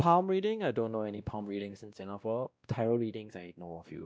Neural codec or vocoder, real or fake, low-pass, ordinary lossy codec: codec, 16 kHz, 1 kbps, X-Codec, WavLM features, trained on Multilingual LibriSpeech; fake; none; none